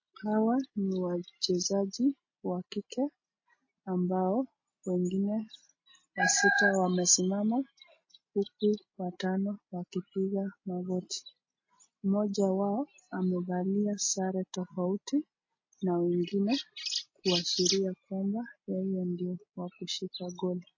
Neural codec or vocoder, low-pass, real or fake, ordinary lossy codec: none; 7.2 kHz; real; MP3, 32 kbps